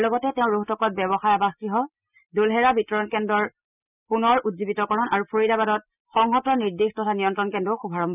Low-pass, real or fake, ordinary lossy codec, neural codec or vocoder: 3.6 kHz; real; none; none